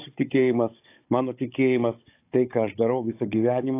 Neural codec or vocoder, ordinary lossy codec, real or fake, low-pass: codec, 16 kHz, 16 kbps, FunCodec, trained on Chinese and English, 50 frames a second; AAC, 24 kbps; fake; 3.6 kHz